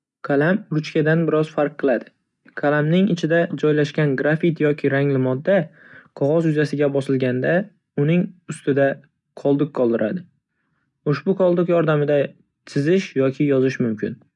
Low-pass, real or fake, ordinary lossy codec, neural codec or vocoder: 10.8 kHz; real; none; none